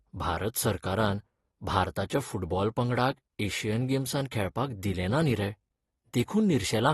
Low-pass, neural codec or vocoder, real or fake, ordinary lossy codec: 19.8 kHz; none; real; AAC, 32 kbps